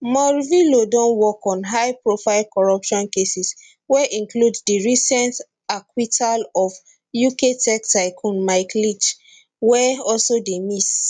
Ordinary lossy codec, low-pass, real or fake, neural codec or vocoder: none; 9.9 kHz; real; none